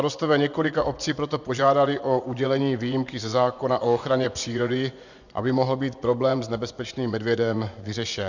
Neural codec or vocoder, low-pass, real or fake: vocoder, 24 kHz, 100 mel bands, Vocos; 7.2 kHz; fake